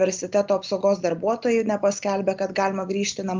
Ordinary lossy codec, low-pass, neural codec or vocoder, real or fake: Opus, 24 kbps; 7.2 kHz; none; real